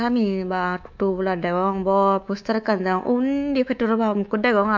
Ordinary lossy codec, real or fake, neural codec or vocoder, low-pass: MP3, 64 kbps; fake; codec, 24 kHz, 3.1 kbps, DualCodec; 7.2 kHz